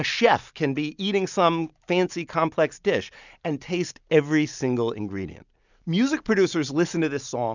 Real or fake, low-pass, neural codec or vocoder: real; 7.2 kHz; none